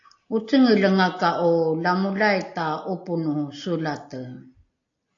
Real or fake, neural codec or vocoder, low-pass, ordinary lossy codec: real; none; 7.2 kHz; AAC, 48 kbps